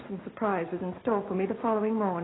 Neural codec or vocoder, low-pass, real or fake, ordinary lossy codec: none; 7.2 kHz; real; AAC, 16 kbps